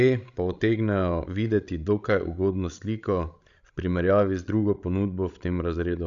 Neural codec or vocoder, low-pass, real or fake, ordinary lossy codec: codec, 16 kHz, 16 kbps, FreqCodec, larger model; 7.2 kHz; fake; none